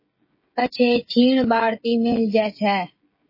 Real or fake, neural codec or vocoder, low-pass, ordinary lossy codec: fake; codec, 16 kHz, 16 kbps, FreqCodec, smaller model; 5.4 kHz; MP3, 24 kbps